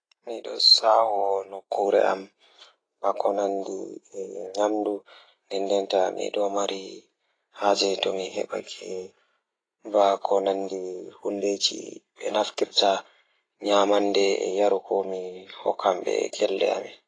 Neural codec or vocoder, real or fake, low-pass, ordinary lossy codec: none; real; 9.9 kHz; AAC, 32 kbps